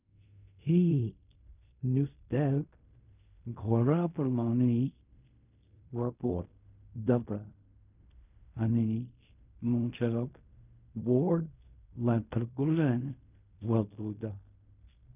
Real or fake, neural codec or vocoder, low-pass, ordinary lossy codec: fake; codec, 16 kHz in and 24 kHz out, 0.4 kbps, LongCat-Audio-Codec, fine tuned four codebook decoder; 3.6 kHz; none